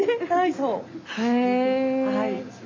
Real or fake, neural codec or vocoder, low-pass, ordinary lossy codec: real; none; 7.2 kHz; MP3, 64 kbps